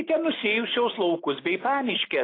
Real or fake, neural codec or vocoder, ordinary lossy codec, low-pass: real; none; AAC, 24 kbps; 5.4 kHz